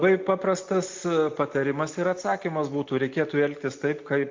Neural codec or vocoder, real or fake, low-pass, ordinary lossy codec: none; real; 7.2 kHz; MP3, 48 kbps